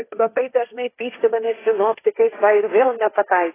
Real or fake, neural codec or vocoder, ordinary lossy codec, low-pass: fake; codec, 16 kHz, 1.1 kbps, Voila-Tokenizer; AAC, 16 kbps; 3.6 kHz